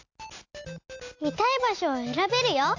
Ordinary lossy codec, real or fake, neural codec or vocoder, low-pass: AAC, 48 kbps; real; none; 7.2 kHz